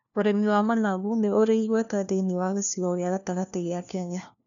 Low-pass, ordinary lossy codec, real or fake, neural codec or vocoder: 7.2 kHz; none; fake; codec, 16 kHz, 1 kbps, FunCodec, trained on LibriTTS, 50 frames a second